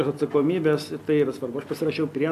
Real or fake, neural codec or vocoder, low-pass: real; none; 14.4 kHz